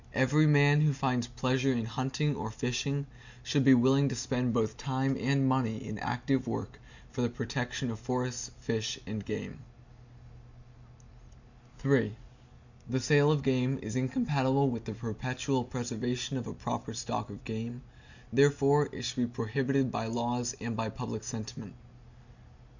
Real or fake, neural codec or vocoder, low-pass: real; none; 7.2 kHz